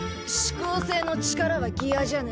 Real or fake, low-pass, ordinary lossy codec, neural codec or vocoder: real; none; none; none